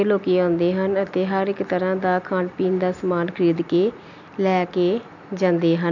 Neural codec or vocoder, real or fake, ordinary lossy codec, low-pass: none; real; none; 7.2 kHz